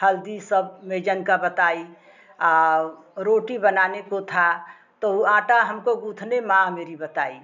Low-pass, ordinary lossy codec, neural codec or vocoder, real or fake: 7.2 kHz; none; none; real